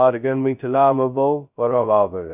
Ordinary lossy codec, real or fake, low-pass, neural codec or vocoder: none; fake; 3.6 kHz; codec, 16 kHz, 0.2 kbps, FocalCodec